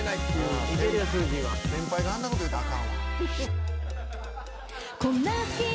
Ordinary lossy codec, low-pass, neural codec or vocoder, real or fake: none; none; none; real